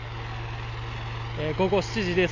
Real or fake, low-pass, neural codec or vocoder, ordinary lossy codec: real; 7.2 kHz; none; none